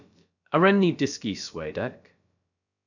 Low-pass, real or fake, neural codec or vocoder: 7.2 kHz; fake; codec, 16 kHz, about 1 kbps, DyCAST, with the encoder's durations